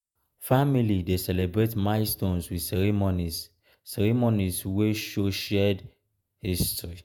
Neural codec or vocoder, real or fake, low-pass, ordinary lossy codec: vocoder, 48 kHz, 128 mel bands, Vocos; fake; none; none